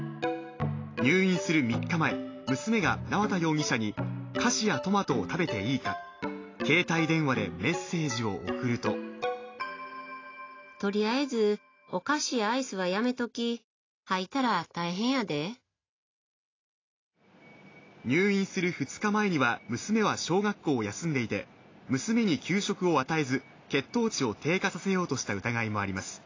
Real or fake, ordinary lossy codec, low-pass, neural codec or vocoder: real; AAC, 32 kbps; 7.2 kHz; none